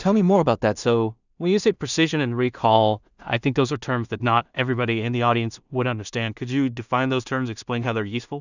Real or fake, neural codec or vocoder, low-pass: fake; codec, 16 kHz in and 24 kHz out, 0.4 kbps, LongCat-Audio-Codec, two codebook decoder; 7.2 kHz